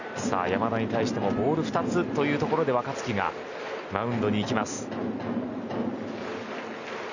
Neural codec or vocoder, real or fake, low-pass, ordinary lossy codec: none; real; 7.2 kHz; none